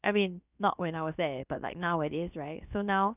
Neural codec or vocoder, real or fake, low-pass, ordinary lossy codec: codec, 16 kHz, about 1 kbps, DyCAST, with the encoder's durations; fake; 3.6 kHz; none